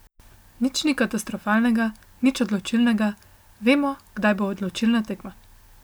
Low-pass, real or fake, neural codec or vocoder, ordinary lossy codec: none; real; none; none